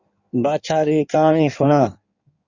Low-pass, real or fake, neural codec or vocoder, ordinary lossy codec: 7.2 kHz; fake; codec, 16 kHz in and 24 kHz out, 1.1 kbps, FireRedTTS-2 codec; Opus, 64 kbps